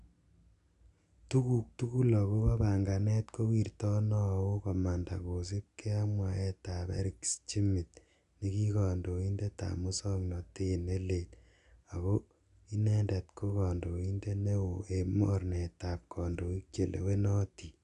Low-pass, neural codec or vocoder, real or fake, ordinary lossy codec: 10.8 kHz; none; real; none